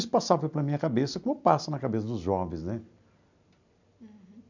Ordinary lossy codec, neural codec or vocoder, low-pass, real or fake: none; none; 7.2 kHz; real